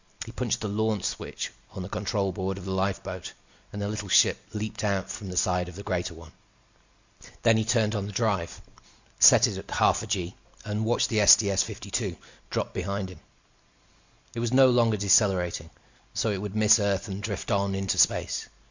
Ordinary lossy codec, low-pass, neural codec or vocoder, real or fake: Opus, 64 kbps; 7.2 kHz; none; real